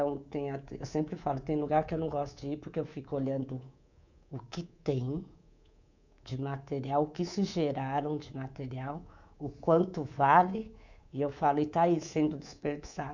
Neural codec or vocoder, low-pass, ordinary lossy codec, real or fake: codec, 24 kHz, 3.1 kbps, DualCodec; 7.2 kHz; none; fake